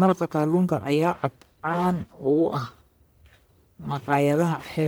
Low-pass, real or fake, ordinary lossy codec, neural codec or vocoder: none; fake; none; codec, 44.1 kHz, 1.7 kbps, Pupu-Codec